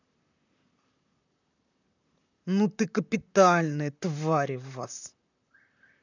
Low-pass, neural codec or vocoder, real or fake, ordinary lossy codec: 7.2 kHz; vocoder, 44.1 kHz, 128 mel bands, Pupu-Vocoder; fake; none